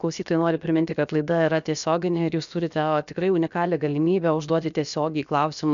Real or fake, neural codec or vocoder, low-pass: fake; codec, 16 kHz, about 1 kbps, DyCAST, with the encoder's durations; 7.2 kHz